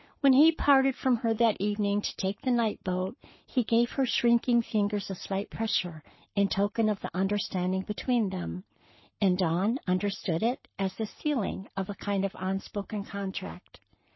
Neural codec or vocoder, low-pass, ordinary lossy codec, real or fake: codec, 44.1 kHz, 7.8 kbps, Pupu-Codec; 7.2 kHz; MP3, 24 kbps; fake